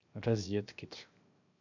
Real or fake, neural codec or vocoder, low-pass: fake; codec, 16 kHz, 0.7 kbps, FocalCodec; 7.2 kHz